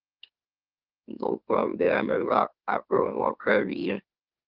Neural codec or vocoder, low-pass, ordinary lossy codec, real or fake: autoencoder, 44.1 kHz, a latent of 192 numbers a frame, MeloTTS; 5.4 kHz; Opus, 32 kbps; fake